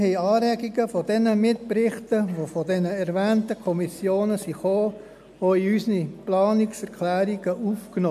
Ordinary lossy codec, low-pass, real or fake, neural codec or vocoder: MP3, 96 kbps; 14.4 kHz; real; none